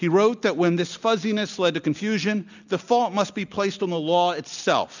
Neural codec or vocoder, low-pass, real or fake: none; 7.2 kHz; real